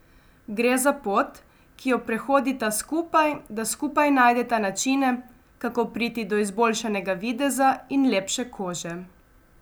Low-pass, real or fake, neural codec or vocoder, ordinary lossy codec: none; real; none; none